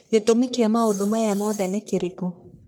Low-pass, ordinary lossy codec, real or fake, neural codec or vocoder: none; none; fake; codec, 44.1 kHz, 1.7 kbps, Pupu-Codec